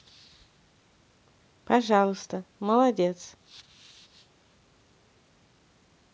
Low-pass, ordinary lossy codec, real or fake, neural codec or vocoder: none; none; real; none